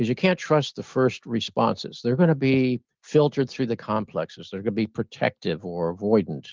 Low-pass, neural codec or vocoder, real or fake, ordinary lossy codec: 7.2 kHz; none; real; Opus, 32 kbps